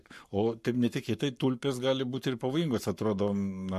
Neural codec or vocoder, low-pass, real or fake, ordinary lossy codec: codec, 44.1 kHz, 7.8 kbps, Pupu-Codec; 14.4 kHz; fake; MP3, 64 kbps